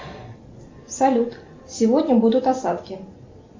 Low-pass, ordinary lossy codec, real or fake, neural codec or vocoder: 7.2 kHz; MP3, 48 kbps; real; none